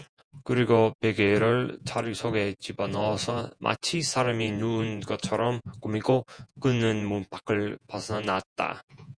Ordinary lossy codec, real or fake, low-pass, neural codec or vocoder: MP3, 96 kbps; fake; 9.9 kHz; vocoder, 48 kHz, 128 mel bands, Vocos